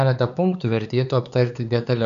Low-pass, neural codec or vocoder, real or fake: 7.2 kHz; codec, 16 kHz, 4 kbps, X-Codec, HuBERT features, trained on LibriSpeech; fake